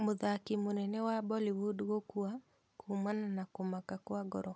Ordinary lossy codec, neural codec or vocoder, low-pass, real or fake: none; none; none; real